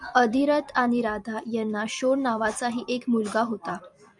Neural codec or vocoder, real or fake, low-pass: vocoder, 44.1 kHz, 128 mel bands every 256 samples, BigVGAN v2; fake; 10.8 kHz